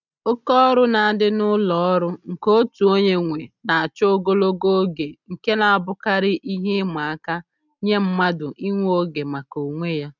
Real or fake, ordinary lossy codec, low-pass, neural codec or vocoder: real; none; 7.2 kHz; none